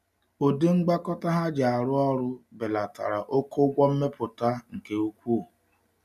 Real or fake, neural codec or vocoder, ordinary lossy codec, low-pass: real; none; none; 14.4 kHz